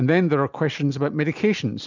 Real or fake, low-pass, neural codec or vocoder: real; 7.2 kHz; none